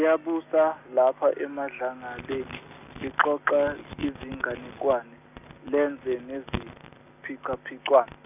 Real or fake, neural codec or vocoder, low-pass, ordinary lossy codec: real; none; 3.6 kHz; none